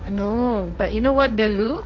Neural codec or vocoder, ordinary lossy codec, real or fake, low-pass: codec, 16 kHz, 1.1 kbps, Voila-Tokenizer; none; fake; 7.2 kHz